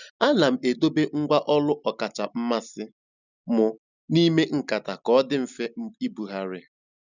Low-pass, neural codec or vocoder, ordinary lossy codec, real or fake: 7.2 kHz; none; none; real